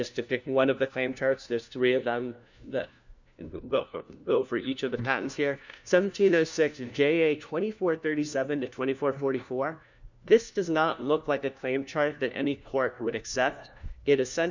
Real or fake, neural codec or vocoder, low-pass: fake; codec, 16 kHz, 1 kbps, FunCodec, trained on LibriTTS, 50 frames a second; 7.2 kHz